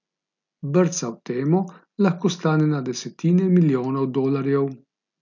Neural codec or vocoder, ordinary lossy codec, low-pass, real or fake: none; none; 7.2 kHz; real